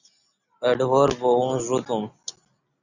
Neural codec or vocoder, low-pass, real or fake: none; 7.2 kHz; real